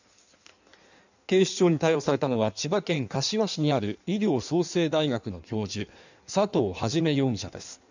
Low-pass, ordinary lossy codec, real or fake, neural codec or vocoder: 7.2 kHz; none; fake; codec, 16 kHz in and 24 kHz out, 1.1 kbps, FireRedTTS-2 codec